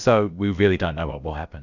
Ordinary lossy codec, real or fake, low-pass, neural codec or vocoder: Opus, 64 kbps; fake; 7.2 kHz; codec, 16 kHz, about 1 kbps, DyCAST, with the encoder's durations